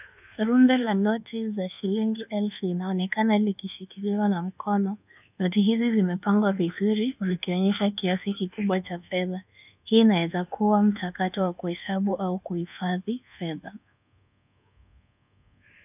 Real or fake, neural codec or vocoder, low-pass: fake; codec, 24 kHz, 1.2 kbps, DualCodec; 3.6 kHz